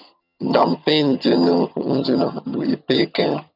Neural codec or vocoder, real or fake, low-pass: vocoder, 22.05 kHz, 80 mel bands, HiFi-GAN; fake; 5.4 kHz